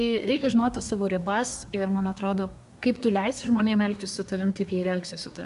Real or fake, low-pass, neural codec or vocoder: fake; 10.8 kHz; codec, 24 kHz, 1 kbps, SNAC